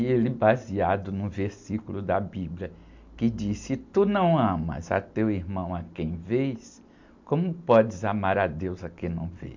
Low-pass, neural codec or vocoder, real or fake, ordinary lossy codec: 7.2 kHz; none; real; MP3, 64 kbps